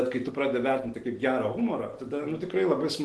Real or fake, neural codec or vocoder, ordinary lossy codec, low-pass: real; none; Opus, 16 kbps; 10.8 kHz